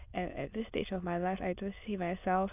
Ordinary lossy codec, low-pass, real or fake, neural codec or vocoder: none; 3.6 kHz; fake; autoencoder, 22.05 kHz, a latent of 192 numbers a frame, VITS, trained on many speakers